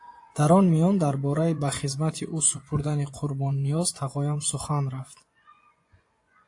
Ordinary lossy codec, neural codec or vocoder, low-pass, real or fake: AAC, 48 kbps; vocoder, 24 kHz, 100 mel bands, Vocos; 10.8 kHz; fake